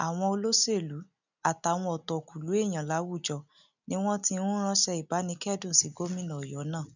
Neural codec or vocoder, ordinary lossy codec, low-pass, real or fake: none; none; 7.2 kHz; real